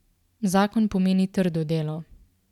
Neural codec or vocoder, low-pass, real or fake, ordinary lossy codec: vocoder, 44.1 kHz, 128 mel bands every 512 samples, BigVGAN v2; 19.8 kHz; fake; none